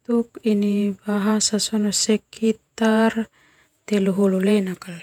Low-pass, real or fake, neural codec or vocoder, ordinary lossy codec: 19.8 kHz; fake; vocoder, 48 kHz, 128 mel bands, Vocos; none